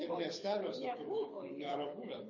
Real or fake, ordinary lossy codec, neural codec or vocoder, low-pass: fake; MP3, 32 kbps; codec, 24 kHz, 6 kbps, HILCodec; 7.2 kHz